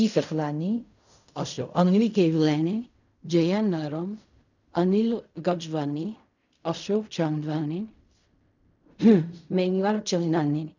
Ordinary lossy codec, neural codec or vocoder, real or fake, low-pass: none; codec, 16 kHz in and 24 kHz out, 0.4 kbps, LongCat-Audio-Codec, fine tuned four codebook decoder; fake; 7.2 kHz